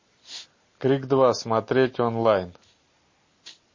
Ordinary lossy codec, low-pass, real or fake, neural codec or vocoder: MP3, 32 kbps; 7.2 kHz; real; none